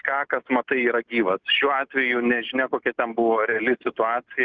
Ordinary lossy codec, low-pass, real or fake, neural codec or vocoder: Opus, 24 kbps; 7.2 kHz; real; none